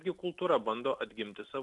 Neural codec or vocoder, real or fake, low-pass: none; real; 10.8 kHz